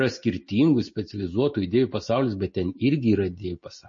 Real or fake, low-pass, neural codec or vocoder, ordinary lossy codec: real; 7.2 kHz; none; MP3, 32 kbps